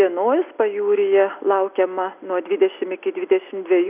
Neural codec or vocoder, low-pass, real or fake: none; 3.6 kHz; real